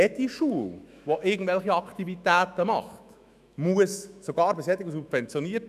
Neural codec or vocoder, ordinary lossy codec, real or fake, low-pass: autoencoder, 48 kHz, 128 numbers a frame, DAC-VAE, trained on Japanese speech; none; fake; 14.4 kHz